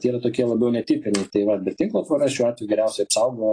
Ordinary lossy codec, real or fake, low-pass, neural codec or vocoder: AAC, 32 kbps; real; 9.9 kHz; none